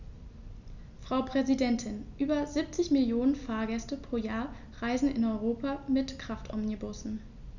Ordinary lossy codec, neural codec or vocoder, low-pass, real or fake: none; none; 7.2 kHz; real